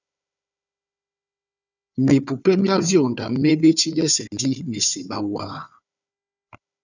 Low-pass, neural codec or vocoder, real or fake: 7.2 kHz; codec, 16 kHz, 4 kbps, FunCodec, trained on Chinese and English, 50 frames a second; fake